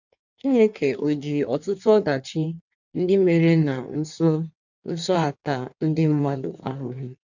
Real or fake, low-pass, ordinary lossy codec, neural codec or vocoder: fake; 7.2 kHz; none; codec, 16 kHz in and 24 kHz out, 1.1 kbps, FireRedTTS-2 codec